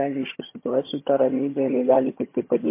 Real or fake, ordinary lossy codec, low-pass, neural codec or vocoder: fake; MP3, 24 kbps; 3.6 kHz; vocoder, 22.05 kHz, 80 mel bands, HiFi-GAN